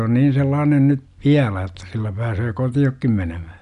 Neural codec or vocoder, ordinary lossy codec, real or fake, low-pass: none; none; real; 10.8 kHz